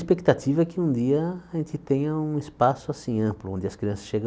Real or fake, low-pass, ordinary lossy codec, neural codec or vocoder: real; none; none; none